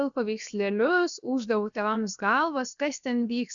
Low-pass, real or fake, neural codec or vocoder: 7.2 kHz; fake; codec, 16 kHz, 0.7 kbps, FocalCodec